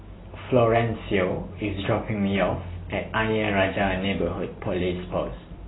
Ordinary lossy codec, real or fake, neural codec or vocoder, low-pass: AAC, 16 kbps; real; none; 7.2 kHz